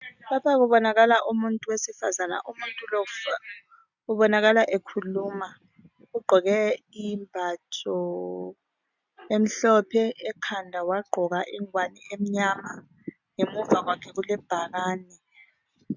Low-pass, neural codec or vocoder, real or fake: 7.2 kHz; none; real